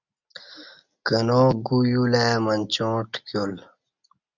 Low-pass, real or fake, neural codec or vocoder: 7.2 kHz; real; none